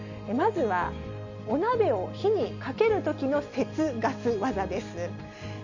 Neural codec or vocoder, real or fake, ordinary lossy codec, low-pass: none; real; none; 7.2 kHz